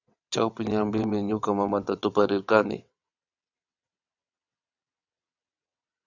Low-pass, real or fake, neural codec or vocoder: 7.2 kHz; fake; vocoder, 22.05 kHz, 80 mel bands, WaveNeXt